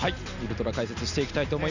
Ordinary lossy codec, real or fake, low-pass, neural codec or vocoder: none; real; 7.2 kHz; none